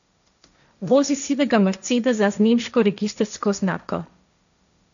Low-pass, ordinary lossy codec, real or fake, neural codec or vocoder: 7.2 kHz; none; fake; codec, 16 kHz, 1.1 kbps, Voila-Tokenizer